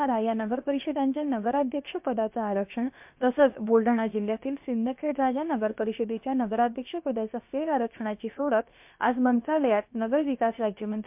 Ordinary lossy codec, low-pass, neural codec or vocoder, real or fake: MP3, 32 kbps; 3.6 kHz; codec, 16 kHz, about 1 kbps, DyCAST, with the encoder's durations; fake